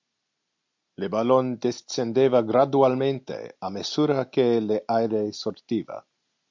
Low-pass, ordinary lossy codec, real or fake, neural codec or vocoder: 7.2 kHz; MP3, 64 kbps; real; none